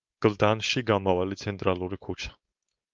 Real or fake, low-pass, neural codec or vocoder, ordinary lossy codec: fake; 7.2 kHz; codec, 16 kHz, 4.8 kbps, FACodec; Opus, 24 kbps